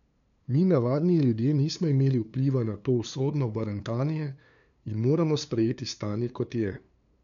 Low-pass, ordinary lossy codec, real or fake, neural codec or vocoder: 7.2 kHz; none; fake; codec, 16 kHz, 2 kbps, FunCodec, trained on LibriTTS, 25 frames a second